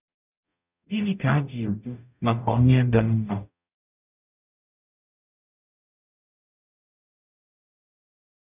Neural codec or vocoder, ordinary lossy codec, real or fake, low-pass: codec, 44.1 kHz, 0.9 kbps, DAC; none; fake; 3.6 kHz